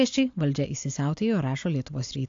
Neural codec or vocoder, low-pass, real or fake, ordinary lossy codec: none; 7.2 kHz; real; AAC, 48 kbps